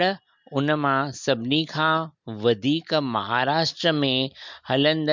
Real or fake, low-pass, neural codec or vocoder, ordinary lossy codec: real; 7.2 kHz; none; MP3, 64 kbps